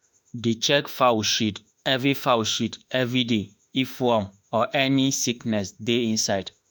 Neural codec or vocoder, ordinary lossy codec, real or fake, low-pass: autoencoder, 48 kHz, 32 numbers a frame, DAC-VAE, trained on Japanese speech; none; fake; none